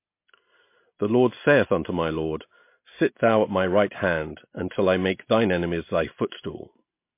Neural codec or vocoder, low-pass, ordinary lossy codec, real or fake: none; 3.6 kHz; MP3, 24 kbps; real